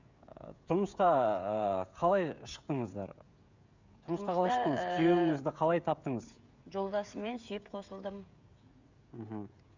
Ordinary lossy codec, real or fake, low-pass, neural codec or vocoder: Opus, 64 kbps; fake; 7.2 kHz; codec, 16 kHz, 16 kbps, FreqCodec, smaller model